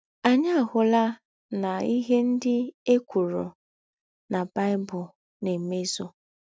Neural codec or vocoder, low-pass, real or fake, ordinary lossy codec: none; none; real; none